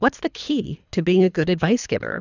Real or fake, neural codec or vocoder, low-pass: fake; codec, 24 kHz, 3 kbps, HILCodec; 7.2 kHz